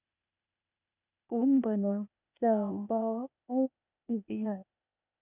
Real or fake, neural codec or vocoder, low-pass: fake; codec, 16 kHz, 0.8 kbps, ZipCodec; 3.6 kHz